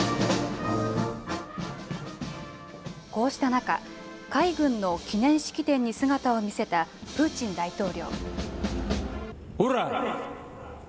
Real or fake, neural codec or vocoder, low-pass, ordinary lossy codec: real; none; none; none